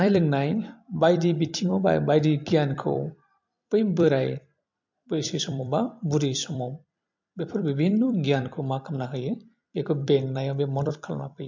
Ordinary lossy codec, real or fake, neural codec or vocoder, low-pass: MP3, 48 kbps; fake; vocoder, 44.1 kHz, 128 mel bands every 256 samples, BigVGAN v2; 7.2 kHz